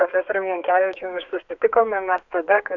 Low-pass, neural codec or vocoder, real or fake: 7.2 kHz; codec, 32 kHz, 1.9 kbps, SNAC; fake